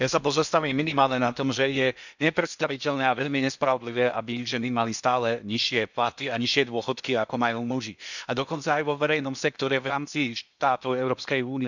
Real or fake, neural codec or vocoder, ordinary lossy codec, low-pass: fake; codec, 16 kHz in and 24 kHz out, 0.8 kbps, FocalCodec, streaming, 65536 codes; none; 7.2 kHz